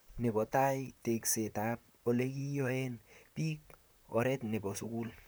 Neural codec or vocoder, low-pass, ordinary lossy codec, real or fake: vocoder, 44.1 kHz, 128 mel bands, Pupu-Vocoder; none; none; fake